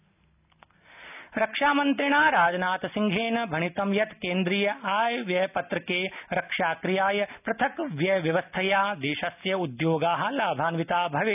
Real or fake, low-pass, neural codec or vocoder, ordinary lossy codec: real; 3.6 kHz; none; none